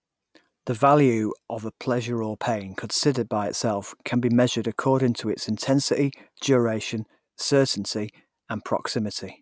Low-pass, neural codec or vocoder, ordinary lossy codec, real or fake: none; none; none; real